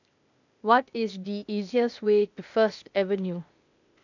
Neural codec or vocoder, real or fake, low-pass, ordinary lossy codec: codec, 16 kHz, 0.8 kbps, ZipCodec; fake; 7.2 kHz; none